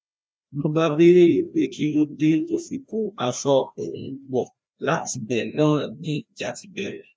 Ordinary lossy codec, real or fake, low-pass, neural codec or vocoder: none; fake; none; codec, 16 kHz, 1 kbps, FreqCodec, larger model